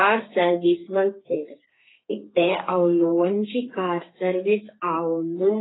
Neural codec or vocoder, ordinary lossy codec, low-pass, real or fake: codec, 32 kHz, 1.9 kbps, SNAC; AAC, 16 kbps; 7.2 kHz; fake